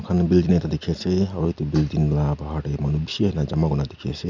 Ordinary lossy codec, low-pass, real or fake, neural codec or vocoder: none; 7.2 kHz; real; none